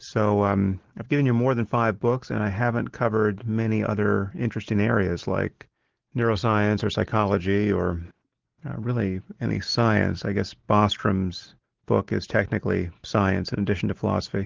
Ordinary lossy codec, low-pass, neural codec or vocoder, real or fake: Opus, 16 kbps; 7.2 kHz; none; real